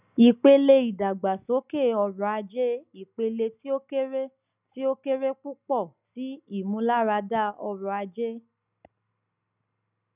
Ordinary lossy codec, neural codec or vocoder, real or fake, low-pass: none; none; real; 3.6 kHz